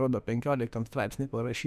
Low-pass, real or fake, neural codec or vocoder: 14.4 kHz; fake; autoencoder, 48 kHz, 32 numbers a frame, DAC-VAE, trained on Japanese speech